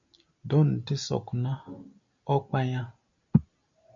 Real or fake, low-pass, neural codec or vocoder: real; 7.2 kHz; none